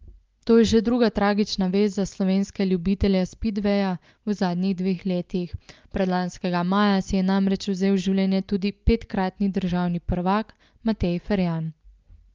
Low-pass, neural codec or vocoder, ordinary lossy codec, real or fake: 7.2 kHz; none; Opus, 32 kbps; real